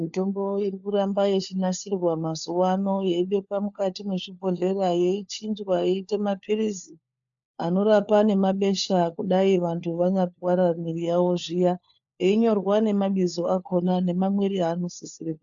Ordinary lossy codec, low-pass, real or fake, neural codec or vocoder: AAC, 64 kbps; 7.2 kHz; fake; codec, 16 kHz, 4 kbps, FunCodec, trained on LibriTTS, 50 frames a second